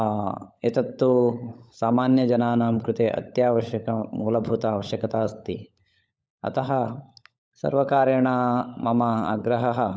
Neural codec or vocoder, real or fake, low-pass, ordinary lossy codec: codec, 16 kHz, 16 kbps, FunCodec, trained on LibriTTS, 50 frames a second; fake; none; none